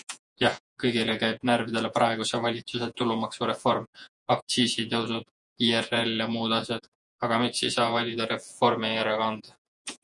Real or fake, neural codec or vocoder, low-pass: fake; vocoder, 48 kHz, 128 mel bands, Vocos; 10.8 kHz